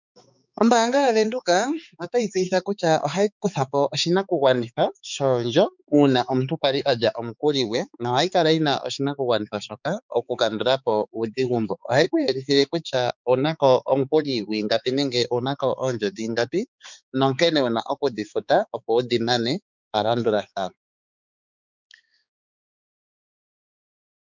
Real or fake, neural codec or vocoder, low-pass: fake; codec, 16 kHz, 4 kbps, X-Codec, HuBERT features, trained on balanced general audio; 7.2 kHz